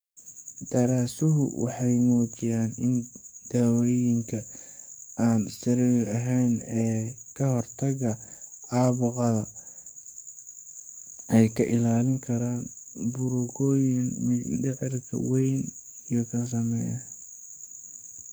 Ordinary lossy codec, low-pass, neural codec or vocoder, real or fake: none; none; codec, 44.1 kHz, 7.8 kbps, DAC; fake